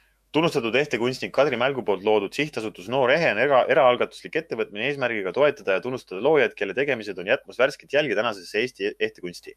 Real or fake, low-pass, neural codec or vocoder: fake; 14.4 kHz; autoencoder, 48 kHz, 128 numbers a frame, DAC-VAE, trained on Japanese speech